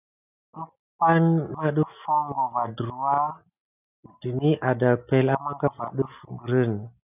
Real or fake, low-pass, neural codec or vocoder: real; 3.6 kHz; none